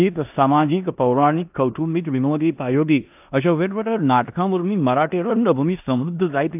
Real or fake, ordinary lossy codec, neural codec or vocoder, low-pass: fake; AAC, 32 kbps; codec, 16 kHz in and 24 kHz out, 0.9 kbps, LongCat-Audio-Codec, four codebook decoder; 3.6 kHz